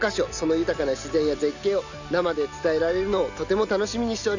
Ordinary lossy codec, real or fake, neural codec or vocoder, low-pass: none; real; none; 7.2 kHz